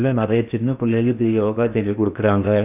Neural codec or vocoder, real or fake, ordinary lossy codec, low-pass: codec, 16 kHz in and 24 kHz out, 0.8 kbps, FocalCodec, streaming, 65536 codes; fake; none; 3.6 kHz